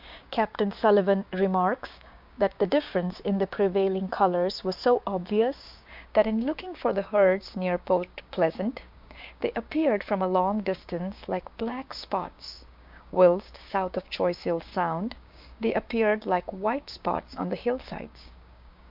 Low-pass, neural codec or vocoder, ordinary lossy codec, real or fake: 5.4 kHz; codec, 16 kHz, 6 kbps, DAC; MP3, 48 kbps; fake